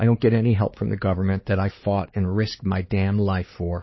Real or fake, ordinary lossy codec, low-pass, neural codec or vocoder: fake; MP3, 24 kbps; 7.2 kHz; codec, 16 kHz, 4 kbps, X-Codec, HuBERT features, trained on LibriSpeech